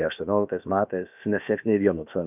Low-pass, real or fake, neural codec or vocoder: 3.6 kHz; fake; codec, 16 kHz, about 1 kbps, DyCAST, with the encoder's durations